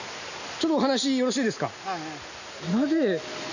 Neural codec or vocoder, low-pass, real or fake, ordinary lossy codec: none; 7.2 kHz; real; none